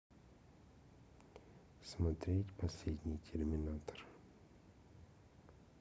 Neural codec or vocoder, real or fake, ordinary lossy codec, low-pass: none; real; none; none